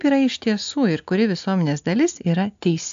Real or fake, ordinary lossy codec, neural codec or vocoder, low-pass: real; AAC, 64 kbps; none; 7.2 kHz